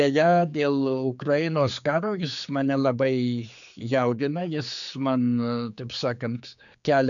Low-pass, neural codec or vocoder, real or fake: 7.2 kHz; codec, 16 kHz, 4 kbps, X-Codec, HuBERT features, trained on general audio; fake